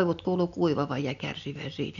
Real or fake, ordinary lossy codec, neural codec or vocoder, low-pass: real; none; none; 7.2 kHz